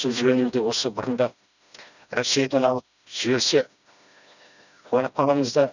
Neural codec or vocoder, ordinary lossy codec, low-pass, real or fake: codec, 16 kHz, 1 kbps, FreqCodec, smaller model; none; 7.2 kHz; fake